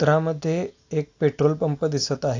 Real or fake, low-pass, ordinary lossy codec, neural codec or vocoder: real; 7.2 kHz; AAC, 48 kbps; none